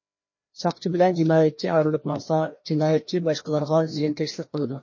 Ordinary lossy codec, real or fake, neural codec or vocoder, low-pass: MP3, 32 kbps; fake; codec, 16 kHz, 1 kbps, FreqCodec, larger model; 7.2 kHz